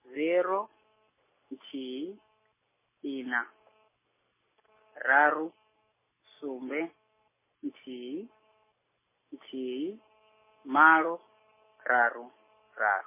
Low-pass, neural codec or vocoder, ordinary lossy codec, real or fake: 3.6 kHz; none; MP3, 16 kbps; real